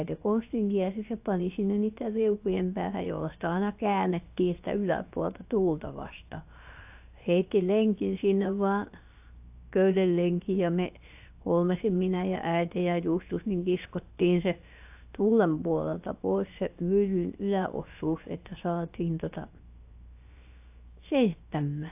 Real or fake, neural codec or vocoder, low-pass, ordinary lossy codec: fake; codec, 16 kHz, 0.7 kbps, FocalCodec; 3.6 kHz; AAC, 32 kbps